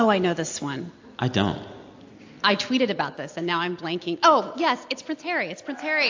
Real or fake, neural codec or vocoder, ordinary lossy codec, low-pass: real; none; AAC, 48 kbps; 7.2 kHz